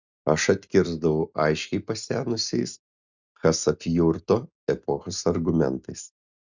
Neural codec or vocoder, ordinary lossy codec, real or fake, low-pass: none; Opus, 64 kbps; real; 7.2 kHz